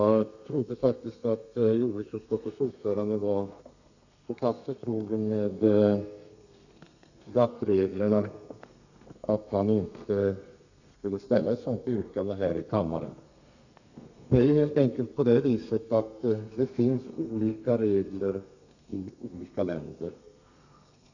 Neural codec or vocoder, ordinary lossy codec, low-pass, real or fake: codec, 32 kHz, 1.9 kbps, SNAC; none; 7.2 kHz; fake